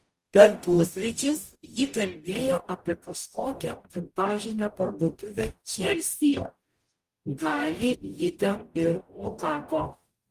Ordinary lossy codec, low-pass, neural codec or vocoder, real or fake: Opus, 32 kbps; 14.4 kHz; codec, 44.1 kHz, 0.9 kbps, DAC; fake